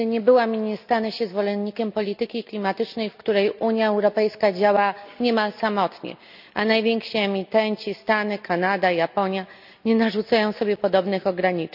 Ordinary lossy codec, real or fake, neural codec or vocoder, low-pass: none; real; none; 5.4 kHz